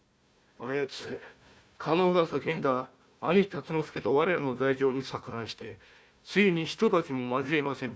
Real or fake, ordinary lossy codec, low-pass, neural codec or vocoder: fake; none; none; codec, 16 kHz, 1 kbps, FunCodec, trained on Chinese and English, 50 frames a second